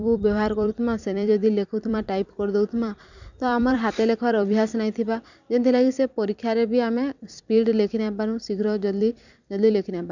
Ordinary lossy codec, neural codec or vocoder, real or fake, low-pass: none; vocoder, 44.1 kHz, 128 mel bands every 256 samples, BigVGAN v2; fake; 7.2 kHz